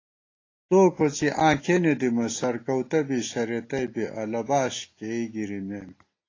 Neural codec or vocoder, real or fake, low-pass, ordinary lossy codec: none; real; 7.2 kHz; AAC, 32 kbps